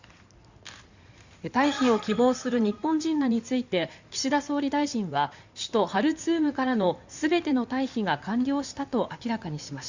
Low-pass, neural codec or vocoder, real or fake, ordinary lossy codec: 7.2 kHz; codec, 16 kHz in and 24 kHz out, 2.2 kbps, FireRedTTS-2 codec; fake; Opus, 64 kbps